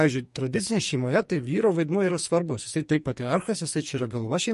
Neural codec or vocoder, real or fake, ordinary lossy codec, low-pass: codec, 44.1 kHz, 2.6 kbps, SNAC; fake; MP3, 48 kbps; 14.4 kHz